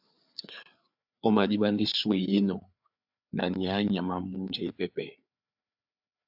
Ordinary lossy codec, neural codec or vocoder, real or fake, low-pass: AAC, 48 kbps; codec, 16 kHz, 4 kbps, FreqCodec, larger model; fake; 5.4 kHz